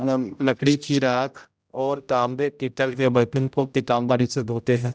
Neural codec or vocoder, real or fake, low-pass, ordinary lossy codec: codec, 16 kHz, 0.5 kbps, X-Codec, HuBERT features, trained on general audio; fake; none; none